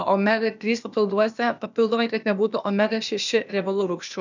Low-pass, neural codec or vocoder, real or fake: 7.2 kHz; codec, 16 kHz, 0.8 kbps, ZipCodec; fake